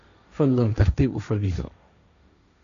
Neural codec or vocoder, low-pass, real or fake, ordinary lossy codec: codec, 16 kHz, 1.1 kbps, Voila-Tokenizer; 7.2 kHz; fake; none